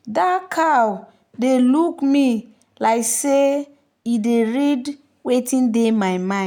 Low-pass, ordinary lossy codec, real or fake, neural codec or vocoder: 19.8 kHz; none; real; none